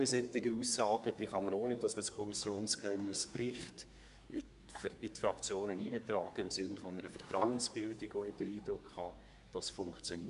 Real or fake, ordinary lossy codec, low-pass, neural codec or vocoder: fake; none; 10.8 kHz; codec, 24 kHz, 1 kbps, SNAC